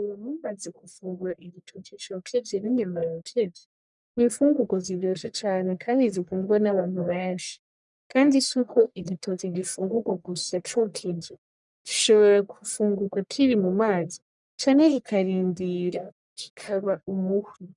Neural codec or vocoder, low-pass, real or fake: codec, 44.1 kHz, 1.7 kbps, Pupu-Codec; 10.8 kHz; fake